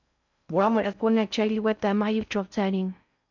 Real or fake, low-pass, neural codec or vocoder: fake; 7.2 kHz; codec, 16 kHz in and 24 kHz out, 0.6 kbps, FocalCodec, streaming, 4096 codes